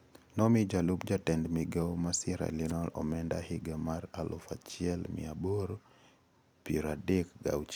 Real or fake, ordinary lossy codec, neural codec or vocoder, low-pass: real; none; none; none